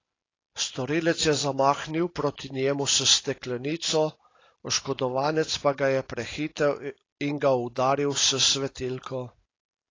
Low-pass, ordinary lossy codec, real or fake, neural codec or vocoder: 7.2 kHz; AAC, 32 kbps; real; none